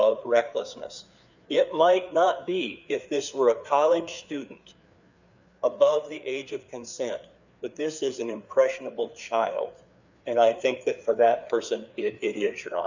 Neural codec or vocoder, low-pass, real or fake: codec, 16 kHz, 4 kbps, FreqCodec, larger model; 7.2 kHz; fake